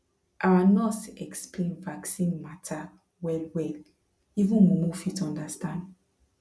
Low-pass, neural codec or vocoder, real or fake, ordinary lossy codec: none; none; real; none